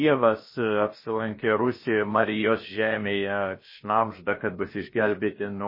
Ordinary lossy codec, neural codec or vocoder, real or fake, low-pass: MP3, 24 kbps; codec, 16 kHz, about 1 kbps, DyCAST, with the encoder's durations; fake; 5.4 kHz